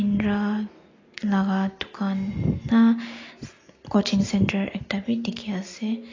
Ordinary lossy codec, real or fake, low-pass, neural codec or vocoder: AAC, 32 kbps; real; 7.2 kHz; none